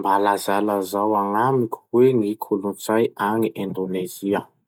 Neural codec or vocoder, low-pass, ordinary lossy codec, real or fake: none; 19.8 kHz; none; real